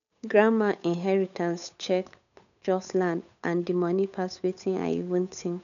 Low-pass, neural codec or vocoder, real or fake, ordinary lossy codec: 7.2 kHz; codec, 16 kHz, 8 kbps, FunCodec, trained on Chinese and English, 25 frames a second; fake; none